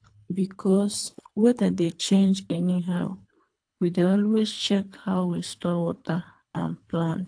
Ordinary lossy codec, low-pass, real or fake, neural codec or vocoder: AAC, 64 kbps; 9.9 kHz; fake; codec, 24 kHz, 3 kbps, HILCodec